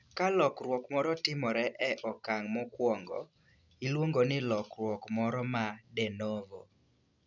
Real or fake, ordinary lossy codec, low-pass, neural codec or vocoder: real; none; 7.2 kHz; none